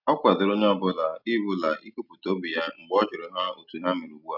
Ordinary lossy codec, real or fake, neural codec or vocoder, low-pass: none; real; none; 5.4 kHz